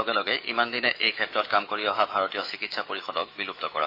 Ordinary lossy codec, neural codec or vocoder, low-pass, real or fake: AAC, 32 kbps; autoencoder, 48 kHz, 128 numbers a frame, DAC-VAE, trained on Japanese speech; 5.4 kHz; fake